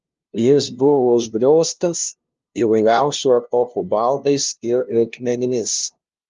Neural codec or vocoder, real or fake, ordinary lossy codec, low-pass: codec, 16 kHz, 0.5 kbps, FunCodec, trained on LibriTTS, 25 frames a second; fake; Opus, 24 kbps; 7.2 kHz